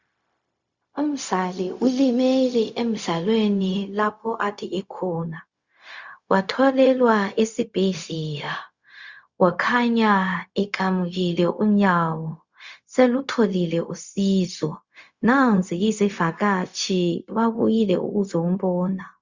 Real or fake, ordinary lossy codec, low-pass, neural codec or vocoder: fake; Opus, 64 kbps; 7.2 kHz; codec, 16 kHz, 0.4 kbps, LongCat-Audio-Codec